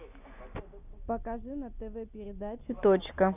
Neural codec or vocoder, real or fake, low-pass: none; real; 3.6 kHz